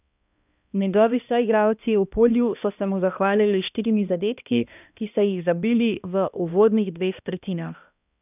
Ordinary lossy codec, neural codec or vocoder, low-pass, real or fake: none; codec, 16 kHz, 1 kbps, X-Codec, HuBERT features, trained on balanced general audio; 3.6 kHz; fake